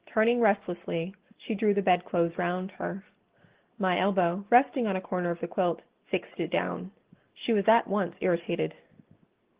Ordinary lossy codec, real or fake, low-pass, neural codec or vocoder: Opus, 16 kbps; real; 3.6 kHz; none